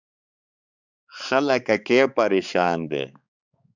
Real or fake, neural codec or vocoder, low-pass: fake; codec, 16 kHz, 4 kbps, X-Codec, HuBERT features, trained on balanced general audio; 7.2 kHz